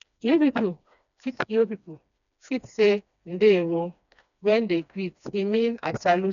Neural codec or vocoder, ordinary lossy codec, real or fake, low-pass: codec, 16 kHz, 2 kbps, FreqCodec, smaller model; none; fake; 7.2 kHz